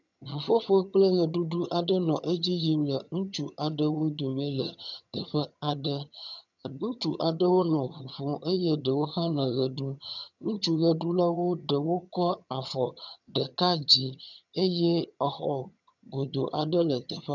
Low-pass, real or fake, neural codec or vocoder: 7.2 kHz; fake; vocoder, 22.05 kHz, 80 mel bands, HiFi-GAN